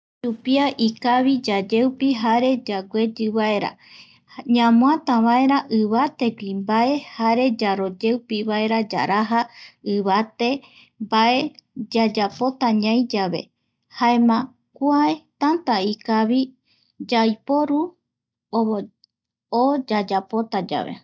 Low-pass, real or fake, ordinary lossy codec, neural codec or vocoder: none; real; none; none